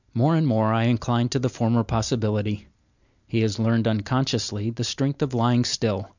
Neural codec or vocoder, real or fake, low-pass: none; real; 7.2 kHz